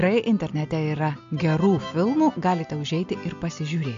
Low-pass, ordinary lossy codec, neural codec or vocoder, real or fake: 7.2 kHz; AAC, 64 kbps; none; real